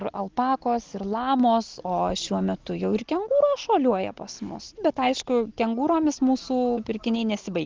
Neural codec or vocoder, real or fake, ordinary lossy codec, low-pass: none; real; Opus, 16 kbps; 7.2 kHz